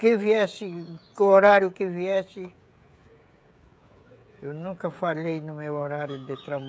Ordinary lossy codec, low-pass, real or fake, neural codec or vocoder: none; none; fake; codec, 16 kHz, 16 kbps, FreqCodec, smaller model